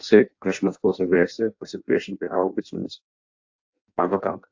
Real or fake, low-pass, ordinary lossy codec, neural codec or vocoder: fake; 7.2 kHz; AAC, 48 kbps; codec, 16 kHz in and 24 kHz out, 0.6 kbps, FireRedTTS-2 codec